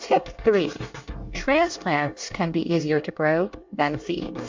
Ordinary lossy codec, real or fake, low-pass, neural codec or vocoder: MP3, 64 kbps; fake; 7.2 kHz; codec, 24 kHz, 1 kbps, SNAC